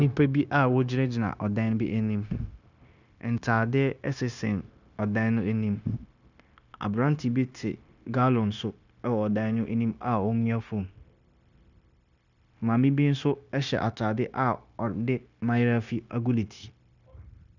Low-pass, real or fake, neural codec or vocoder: 7.2 kHz; fake; codec, 16 kHz, 0.9 kbps, LongCat-Audio-Codec